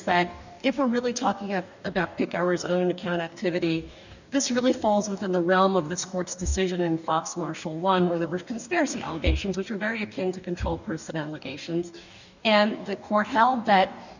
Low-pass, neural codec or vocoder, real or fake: 7.2 kHz; codec, 44.1 kHz, 2.6 kbps, DAC; fake